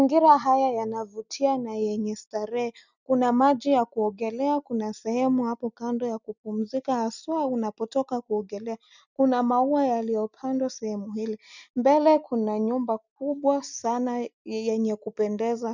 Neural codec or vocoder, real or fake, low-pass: none; real; 7.2 kHz